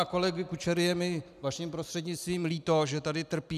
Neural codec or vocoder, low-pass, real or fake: none; 14.4 kHz; real